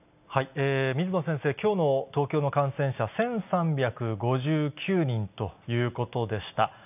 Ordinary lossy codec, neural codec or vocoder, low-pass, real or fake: none; none; 3.6 kHz; real